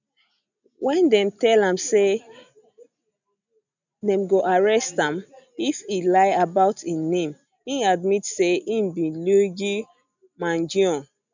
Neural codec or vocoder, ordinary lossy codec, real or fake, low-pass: none; none; real; 7.2 kHz